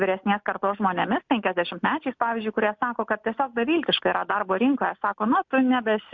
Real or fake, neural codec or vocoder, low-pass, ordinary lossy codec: real; none; 7.2 kHz; MP3, 64 kbps